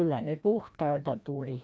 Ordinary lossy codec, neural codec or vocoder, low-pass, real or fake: none; codec, 16 kHz, 1 kbps, FreqCodec, larger model; none; fake